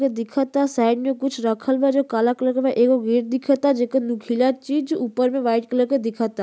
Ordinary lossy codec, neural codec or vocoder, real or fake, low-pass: none; none; real; none